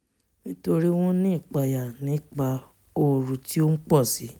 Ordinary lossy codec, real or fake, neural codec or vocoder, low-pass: none; real; none; none